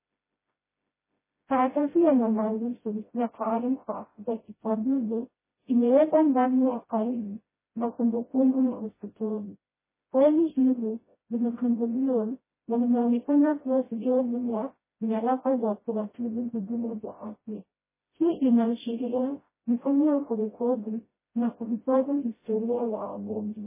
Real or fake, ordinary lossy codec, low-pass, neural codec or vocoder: fake; MP3, 16 kbps; 3.6 kHz; codec, 16 kHz, 0.5 kbps, FreqCodec, smaller model